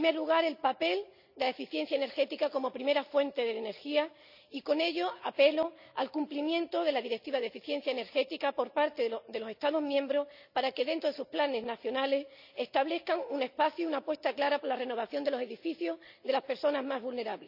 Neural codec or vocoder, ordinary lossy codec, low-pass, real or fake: none; none; 5.4 kHz; real